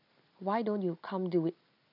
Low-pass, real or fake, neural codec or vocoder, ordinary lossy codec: 5.4 kHz; real; none; none